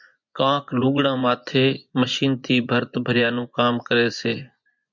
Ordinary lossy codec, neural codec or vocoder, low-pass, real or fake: MP3, 64 kbps; vocoder, 24 kHz, 100 mel bands, Vocos; 7.2 kHz; fake